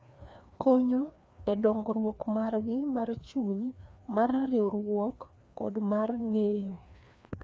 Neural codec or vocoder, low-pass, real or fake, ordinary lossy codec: codec, 16 kHz, 2 kbps, FreqCodec, larger model; none; fake; none